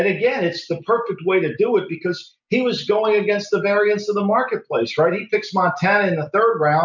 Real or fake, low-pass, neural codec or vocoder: real; 7.2 kHz; none